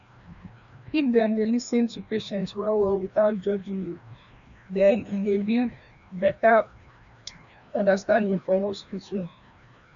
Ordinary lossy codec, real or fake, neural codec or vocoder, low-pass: none; fake; codec, 16 kHz, 1 kbps, FreqCodec, larger model; 7.2 kHz